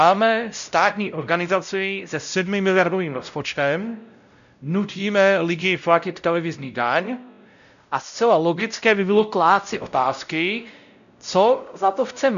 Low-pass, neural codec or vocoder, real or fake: 7.2 kHz; codec, 16 kHz, 0.5 kbps, X-Codec, WavLM features, trained on Multilingual LibriSpeech; fake